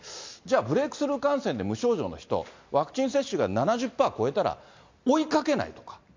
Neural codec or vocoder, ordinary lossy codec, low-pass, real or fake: none; MP3, 64 kbps; 7.2 kHz; real